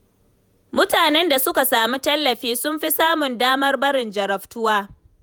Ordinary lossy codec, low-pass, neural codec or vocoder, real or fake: none; none; vocoder, 48 kHz, 128 mel bands, Vocos; fake